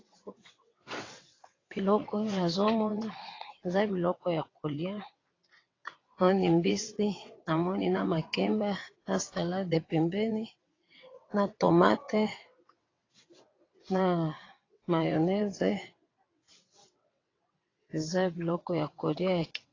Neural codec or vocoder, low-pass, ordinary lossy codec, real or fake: vocoder, 22.05 kHz, 80 mel bands, WaveNeXt; 7.2 kHz; AAC, 32 kbps; fake